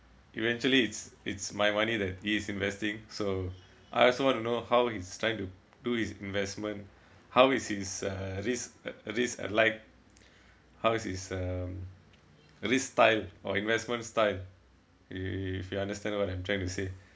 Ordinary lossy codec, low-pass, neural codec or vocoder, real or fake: none; none; none; real